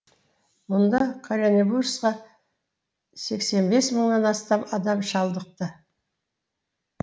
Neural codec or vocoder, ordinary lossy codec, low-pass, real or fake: none; none; none; real